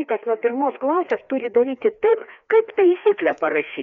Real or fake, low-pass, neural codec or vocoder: fake; 7.2 kHz; codec, 16 kHz, 2 kbps, FreqCodec, larger model